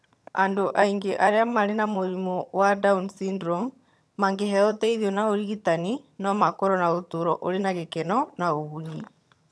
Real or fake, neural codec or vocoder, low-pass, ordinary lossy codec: fake; vocoder, 22.05 kHz, 80 mel bands, HiFi-GAN; none; none